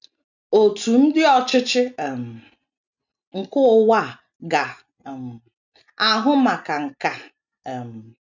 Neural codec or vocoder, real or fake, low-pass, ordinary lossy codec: none; real; 7.2 kHz; none